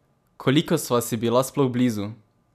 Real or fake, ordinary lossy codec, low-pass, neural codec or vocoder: real; none; 14.4 kHz; none